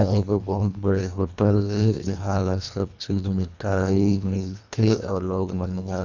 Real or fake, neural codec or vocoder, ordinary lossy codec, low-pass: fake; codec, 24 kHz, 1.5 kbps, HILCodec; none; 7.2 kHz